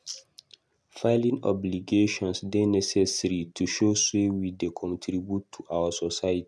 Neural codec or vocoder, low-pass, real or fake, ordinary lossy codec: none; none; real; none